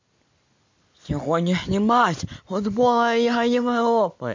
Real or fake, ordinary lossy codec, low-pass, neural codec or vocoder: real; MP3, 48 kbps; 7.2 kHz; none